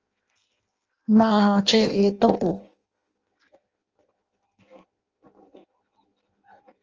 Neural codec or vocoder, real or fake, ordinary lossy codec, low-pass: codec, 16 kHz in and 24 kHz out, 0.6 kbps, FireRedTTS-2 codec; fake; Opus, 24 kbps; 7.2 kHz